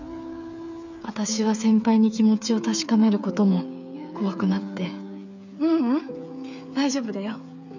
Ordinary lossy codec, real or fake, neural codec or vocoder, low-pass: none; fake; codec, 16 kHz, 8 kbps, FreqCodec, smaller model; 7.2 kHz